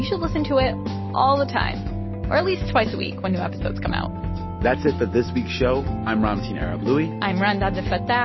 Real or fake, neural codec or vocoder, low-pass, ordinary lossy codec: real; none; 7.2 kHz; MP3, 24 kbps